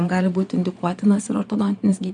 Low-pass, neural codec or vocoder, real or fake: 9.9 kHz; vocoder, 22.05 kHz, 80 mel bands, Vocos; fake